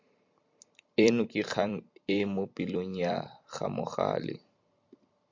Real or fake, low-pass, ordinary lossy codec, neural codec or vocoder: fake; 7.2 kHz; MP3, 48 kbps; vocoder, 44.1 kHz, 128 mel bands every 256 samples, BigVGAN v2